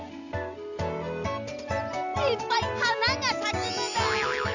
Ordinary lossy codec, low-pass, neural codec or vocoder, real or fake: none; 7.2 kHz; none; real